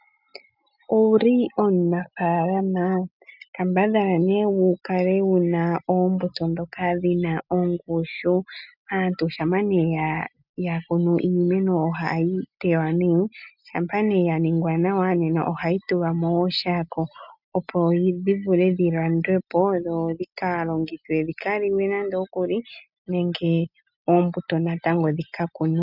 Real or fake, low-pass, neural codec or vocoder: real; 5.4 kHz; none